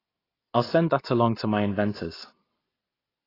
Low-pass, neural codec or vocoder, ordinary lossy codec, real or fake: 5.4 kHz; none; AAC, 24 kbps; real